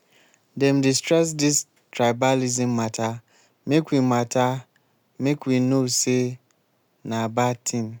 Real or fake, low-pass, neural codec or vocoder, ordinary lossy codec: real; none; none; none